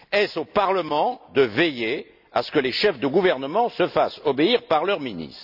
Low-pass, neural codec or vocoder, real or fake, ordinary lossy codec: 5.4 kHz; none; real; none